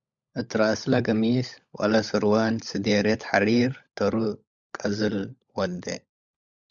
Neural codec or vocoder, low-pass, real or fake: codec, 16 kHz, 16 kbps, FunCodec, trained on LibriTTS, 50 frames a second; 7.2 kHz; fake